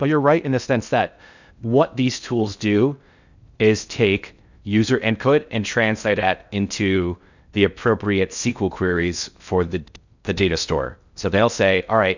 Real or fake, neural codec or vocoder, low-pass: fake; codec, 16 kHz in and 24 kHz out, 0.6 kbps, FocalCodec, streaming, 2048 codes; 7.2 kHz